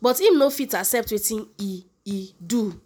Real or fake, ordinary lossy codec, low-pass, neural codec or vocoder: real; none; none; none